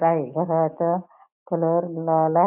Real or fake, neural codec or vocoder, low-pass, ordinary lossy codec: real; none; 3.6 kHz; none